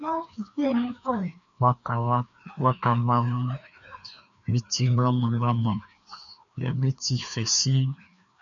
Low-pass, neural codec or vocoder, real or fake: 7.2 kHz; codec, 16 kHz, 2 kbps, FreqCodec, larger model; fake